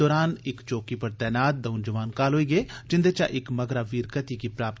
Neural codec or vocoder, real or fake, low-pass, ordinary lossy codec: none; real; none; none